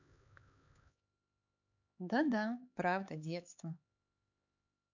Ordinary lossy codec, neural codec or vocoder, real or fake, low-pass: none; codec, 16 kHz, 4 kbps, X-Codec, HuBERT features, trained on LibriSpeech; fake; 7.2 kHz